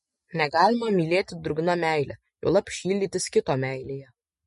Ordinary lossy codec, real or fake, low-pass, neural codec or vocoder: MP3, 48 kbps; real; 14.4 kHz; none